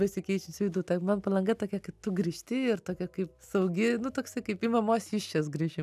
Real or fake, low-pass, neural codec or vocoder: real; 14.4 kHz; none